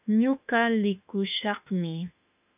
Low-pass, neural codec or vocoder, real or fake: 3.6 kHz; autoencoder, 48 kHz, 32 numbers a frame, DAC-VAE, trained on Japanese speech; fake